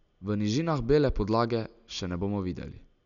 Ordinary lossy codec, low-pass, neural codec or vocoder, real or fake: none; 7.2 kHz; none; real